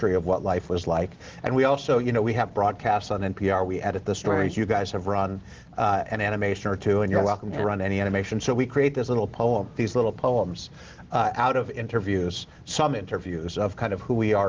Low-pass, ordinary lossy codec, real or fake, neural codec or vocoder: 7.2 kHz; Opus, 16 kbps; real; none